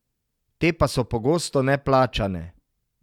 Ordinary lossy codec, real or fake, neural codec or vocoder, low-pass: none; real; none; 19.8 kHz